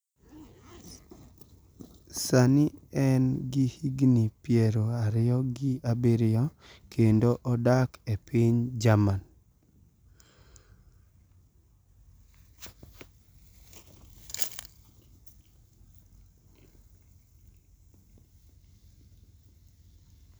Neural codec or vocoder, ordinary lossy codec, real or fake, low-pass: none; none; real; none